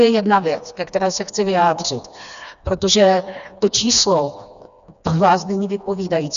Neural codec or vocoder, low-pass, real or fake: codec, 16 kHz, 2 kbps, FreqCodec, smaller model; 7.2 kHz; fake